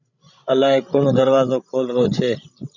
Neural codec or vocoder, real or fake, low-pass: codec, 16 kHz, 16 kbps, FreqCodec, larger model; fake; 7.2 kHz